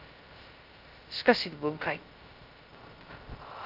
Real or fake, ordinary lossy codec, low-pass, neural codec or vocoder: fake; Opus, 24 kbps; 5.4 kHz; codec, 16 kHz, 0.2 kbps, FocalCodec